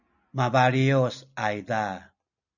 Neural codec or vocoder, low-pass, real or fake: none; 7.2 kHz; real